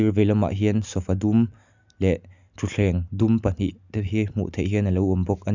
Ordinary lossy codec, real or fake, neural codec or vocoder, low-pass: none; real; none; 7.2 kHz